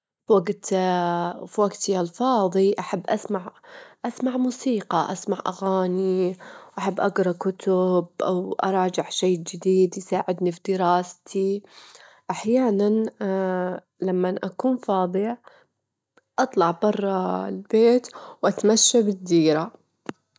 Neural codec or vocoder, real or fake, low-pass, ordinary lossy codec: none; real; none; none